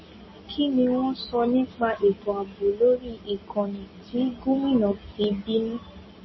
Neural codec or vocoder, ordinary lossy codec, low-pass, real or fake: none; MP3, 24 kbps; 7.2 kHz; real